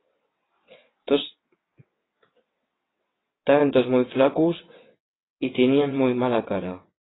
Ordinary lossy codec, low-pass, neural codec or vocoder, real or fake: AAC, 16 kbps; 7.2 kHz; vocoder, 22.05 kHz, 80 mel bands, WaveNeXt; fake